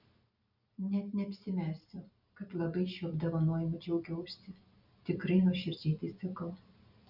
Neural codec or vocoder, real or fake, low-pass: none; real; 5.4 kHz